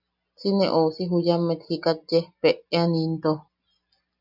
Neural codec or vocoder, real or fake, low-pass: none; real; 5.4 kHz